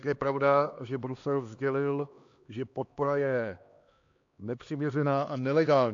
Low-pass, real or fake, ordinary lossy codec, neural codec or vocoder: 7.2 kHz; fake; AAC, 48 kbps; codec, 16 kHz, 2 kbps, X-Codec, HuBERT features, trained on LibriSpeech